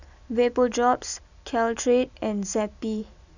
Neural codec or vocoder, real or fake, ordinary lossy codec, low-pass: none; real; none; 7.2 kHz